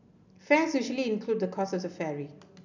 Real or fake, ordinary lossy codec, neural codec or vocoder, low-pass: real; none; none; 7.2 kHz